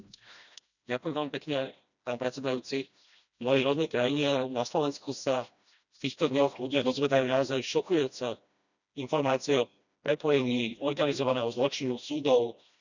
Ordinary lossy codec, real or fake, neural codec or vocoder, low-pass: none; fake; codec, 16 kHz, 1 kbps, FreqCodec, smaller model; 7.2 kHz